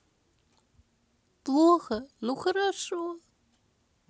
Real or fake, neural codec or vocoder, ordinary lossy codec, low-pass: real; none; none; none